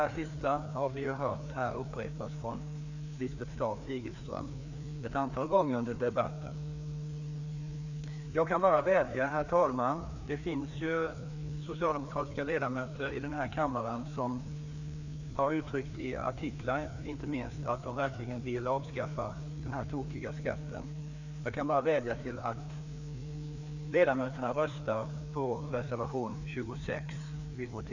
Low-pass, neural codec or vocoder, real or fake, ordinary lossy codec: 7.2 kHz; codec, 16 kHz, 2 kbps, FreqCodec, larger model; fake; none